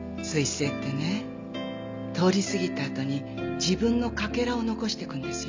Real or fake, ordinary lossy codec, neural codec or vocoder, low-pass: real; MP3, 64 kbps; none; 7.2 kHz